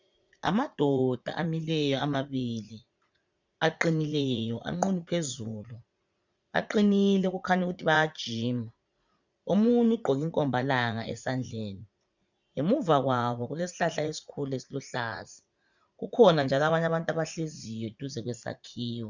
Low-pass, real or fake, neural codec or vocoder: 7.2 kHz; fake; vocoder, 44.1 kHz, 80 mel bands, Vocos